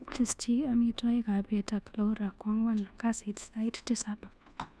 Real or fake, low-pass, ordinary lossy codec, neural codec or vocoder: fake; none; none; codec, 24 kHz, 1.2 kbps, DualCodec